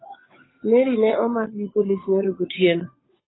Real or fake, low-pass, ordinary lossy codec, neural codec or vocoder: fake; 7.2 kHz; AAC, 16 kbps; codec, 16 kHz, 8 kbps, FunCodec, trained on Chinese and English, 25 frames a second